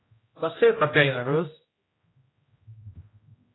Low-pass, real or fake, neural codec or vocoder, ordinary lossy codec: 7.2 kHz; fake; codec, 16 kHz, 0.5 kbps, X-Codec, HuBERT features, trained on general audio; AAC, 16 kbps